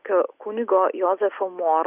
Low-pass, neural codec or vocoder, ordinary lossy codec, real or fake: 3.6 kHz; none; Opus, 64 kbps; real